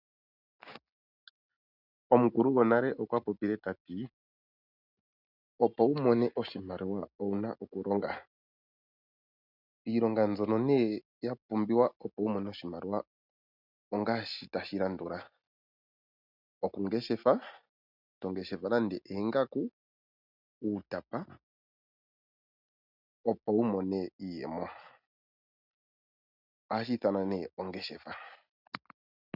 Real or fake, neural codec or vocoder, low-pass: real; none; 5.4 kHz